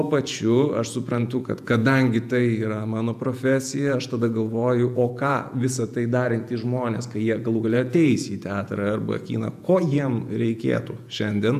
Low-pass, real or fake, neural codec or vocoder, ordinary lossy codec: 14.4 kHz; fake; vocoder, 48 kHz, 128 mel bands, Vocos; AAC, 96 kbps